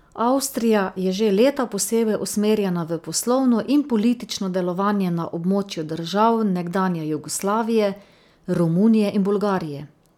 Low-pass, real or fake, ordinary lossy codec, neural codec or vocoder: 19.8 kHz; real; none; none